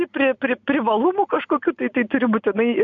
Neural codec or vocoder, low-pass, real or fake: none; 7.2 kHz; real